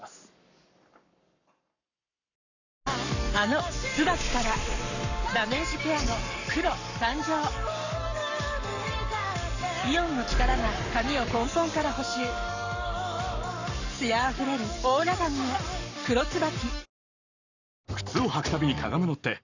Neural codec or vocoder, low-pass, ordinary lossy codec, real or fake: codec, 44.1 kHz, 7.8 kbps, Pupu-Codec; 7.2 kHz; none; fake